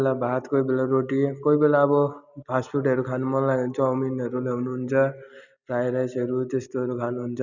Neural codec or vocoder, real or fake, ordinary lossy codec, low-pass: none; real; none; 7.2 kHz